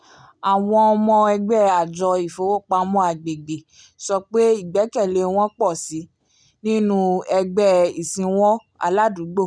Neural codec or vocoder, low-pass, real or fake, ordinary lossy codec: none; 9.9 kHz; real; none